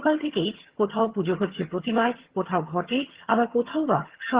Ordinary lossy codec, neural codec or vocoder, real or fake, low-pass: Opus, 16 kbps; vocoder, 22.05 kHz, 80 mel bands, HiFi-GAN; fake; 3.6 kHz